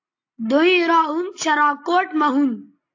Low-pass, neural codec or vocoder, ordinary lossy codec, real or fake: 7.2 kHz; none; AAC, 48 kbps; real